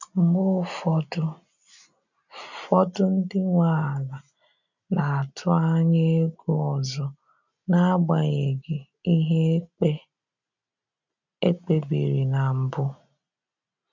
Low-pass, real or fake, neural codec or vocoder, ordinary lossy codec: 7.2 kHz; real; none; none